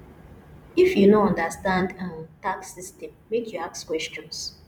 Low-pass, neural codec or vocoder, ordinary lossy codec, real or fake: 19.8 kHz; vocoder, 44.1 kHz, 128 mel bands every 256 samples, BigVGAN v2; none; fake